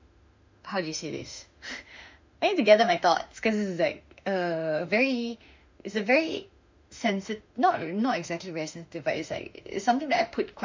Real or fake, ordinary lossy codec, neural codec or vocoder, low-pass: fake; MP3, 64 kbps; autoencoder, 48 kHz, 32 numbers a frame, DAC-VAE, trained on Japanese speech; 7.2 kHz